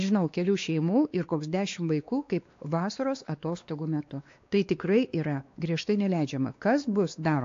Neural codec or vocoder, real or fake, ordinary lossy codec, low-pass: codec, 16 kHz, 2 kbps, X-Codec, WavLM features, trained on Multilingual LibriSpeech; fake; AAC, 48 kbps; 7.2 kHz